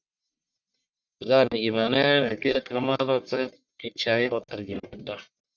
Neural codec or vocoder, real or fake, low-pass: codec, 44.1 kHz, 1.7 kbps, Pupu-Codec; fake; 7.2 kHz